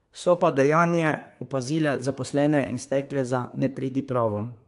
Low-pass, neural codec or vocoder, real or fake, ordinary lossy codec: 10.8 kHz; codec, 24 kHz, 1 kbps, SNAC; fake; AAC, 64 kbps